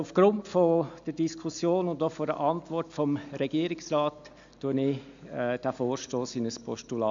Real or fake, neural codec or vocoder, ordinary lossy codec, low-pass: real; none; AAC, 64 kbps; 7.2 kHz